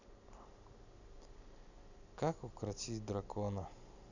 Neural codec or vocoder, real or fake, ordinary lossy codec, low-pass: none; real; none; 7.2 kHz